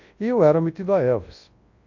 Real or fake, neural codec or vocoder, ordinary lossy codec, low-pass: fake; codec, 24 kHz, 0.9 kbps, WavTokenizer, large speech release; AAC, 48 kbps; 7.2 kHz